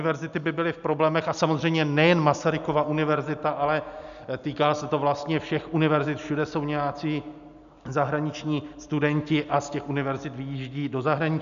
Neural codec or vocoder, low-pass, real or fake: none; 7.2 kHz; real